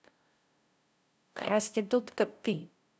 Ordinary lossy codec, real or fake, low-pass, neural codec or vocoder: none; fake; none; codec, 16 kHz, 0.5 kbps, FunCodec, trained on LibriTTS, 25 frames a second